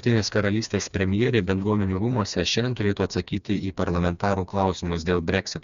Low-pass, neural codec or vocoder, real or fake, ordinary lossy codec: 7.2 kHz; codec, 16 kHz, 2 kbps, FreqCodec, smaller model; fake; Opus, 64 kbps